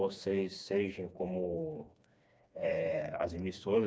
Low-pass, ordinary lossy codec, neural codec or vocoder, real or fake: none; none; codec, 16 kHz, 2 kbps, FreqCodec, smaller model; fake